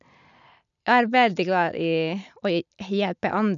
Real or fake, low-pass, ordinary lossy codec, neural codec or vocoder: real; 7.2 kHz; none; none